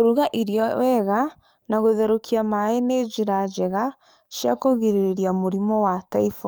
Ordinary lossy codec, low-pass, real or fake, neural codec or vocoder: none; none; fake; codec, 44.1 kHz, 7.8 kbps, DAC